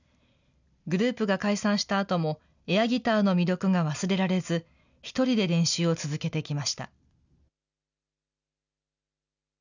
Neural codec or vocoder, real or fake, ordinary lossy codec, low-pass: none; real; none; 7.2 kHz